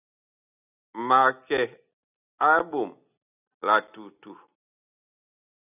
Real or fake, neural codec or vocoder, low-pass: real; none; 3.6 kHz